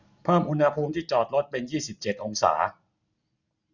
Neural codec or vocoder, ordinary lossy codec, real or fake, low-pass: none; none; real; 7.2 kHz